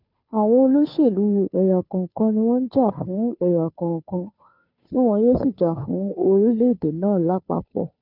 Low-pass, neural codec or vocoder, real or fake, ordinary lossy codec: 5.4 kHz; codec, 16 kHz, 2 kbps, FunCodec, trained on Chinese and English, 25 frames a second; fake; none